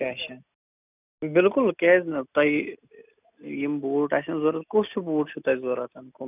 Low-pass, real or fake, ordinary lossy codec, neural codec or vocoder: 3.6 kHz; real; none; none